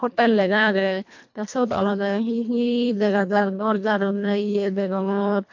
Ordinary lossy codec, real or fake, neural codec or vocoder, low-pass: MP3, 48 kbps; fake; codec, 24 kHz, 1.5 kbps, HILCodec; 7.2 kHz